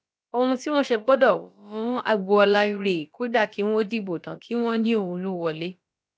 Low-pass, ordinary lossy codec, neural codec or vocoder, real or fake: none; none; codec, 16 kHz, about 1 kbps, DyCAST, with the encoder's durations; fake